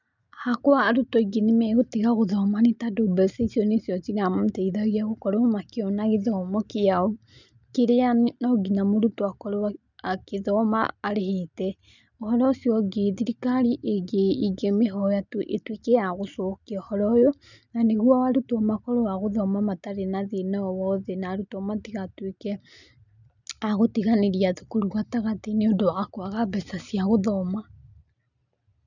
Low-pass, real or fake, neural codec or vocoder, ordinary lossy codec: 7.2 kHz; real; none; none